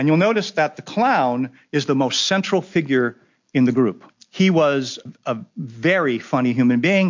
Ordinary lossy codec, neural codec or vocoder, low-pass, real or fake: MP3, 48 kbps; none; 7.2 kHz; real